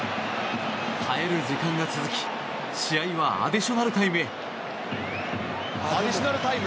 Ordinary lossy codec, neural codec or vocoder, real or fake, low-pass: none; none; real; none